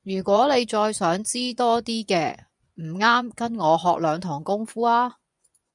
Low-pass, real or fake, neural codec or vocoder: 10.8 kHz; fake; vocoder, 24 kHz, 100 mel bands, Vocos